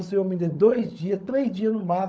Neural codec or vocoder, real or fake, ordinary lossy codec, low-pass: codec, 16 kHz, 16 kbps, FunCodec, trained on LibriTTS, 50 frames a second; fake; none; none